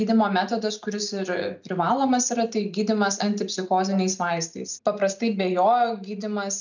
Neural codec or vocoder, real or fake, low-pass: none; real; 7.2 kHz